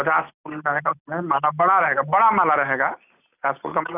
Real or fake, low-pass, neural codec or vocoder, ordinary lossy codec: real; 3.6 kHz; none; none